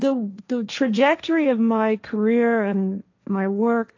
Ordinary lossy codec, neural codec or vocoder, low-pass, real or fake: AAC, 48 kbps; codec, 16 kHz, 1.1 kbps, Voila-Tokenizer; 7.2 kHz; fake